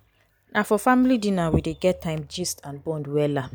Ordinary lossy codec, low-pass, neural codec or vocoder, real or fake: none; none; none; real